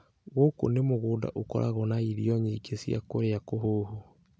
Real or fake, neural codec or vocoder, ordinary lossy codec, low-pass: real; none; none; none